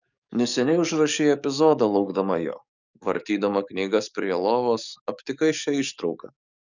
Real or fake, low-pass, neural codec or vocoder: fake; 7.2 kHz; codec, 44.1 kHz, 7.8 kbps, DAC